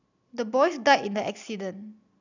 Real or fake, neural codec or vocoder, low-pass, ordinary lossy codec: real; none; 7.2 kHz; none